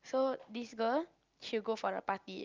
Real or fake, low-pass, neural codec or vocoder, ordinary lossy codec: real; 7.2 kHz; none; Opus, 24 kbps